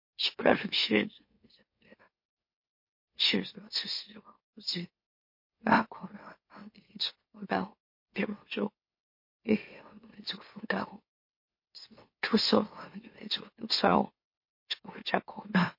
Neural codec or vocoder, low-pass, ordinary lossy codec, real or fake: autoencoder, 44.1 kHz, a latent of 192 numbers a frame, MeloTTS; 5.4 kHz; MP3, 32 kbps; fake